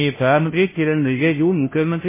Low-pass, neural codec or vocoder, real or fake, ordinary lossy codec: 3.6 kHz; codec, 16 kHz, 0.5 kbps, FunCodec, trained on Chinese and English, 25 frames a second; fake; MP3, 16 kbps